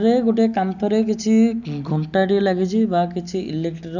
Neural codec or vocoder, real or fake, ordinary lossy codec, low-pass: none; real; none; 7.2 kHz